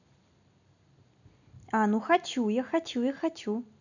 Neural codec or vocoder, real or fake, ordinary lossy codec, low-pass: none; real; none; 7.2 kHz